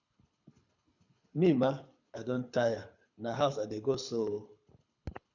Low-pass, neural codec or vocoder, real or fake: 7.2 kHz; codec, 24 kHz, 6 kbps, HILCodec; fake